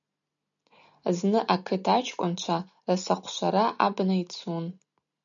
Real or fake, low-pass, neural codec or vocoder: real; 7.2 kHz; none